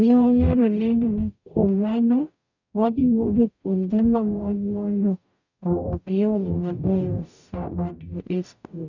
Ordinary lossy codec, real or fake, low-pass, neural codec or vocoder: none; fake; 7.2 kHz; codec, 44.1 kHz, 0.9 kbps, DAC